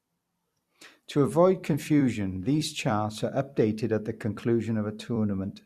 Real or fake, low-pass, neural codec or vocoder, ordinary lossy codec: fake; 14.4 kHz; vocoder, 44.1 kHz, 128 mel bands every 256 samples, BigVGAN v2; Opus, 64 kbps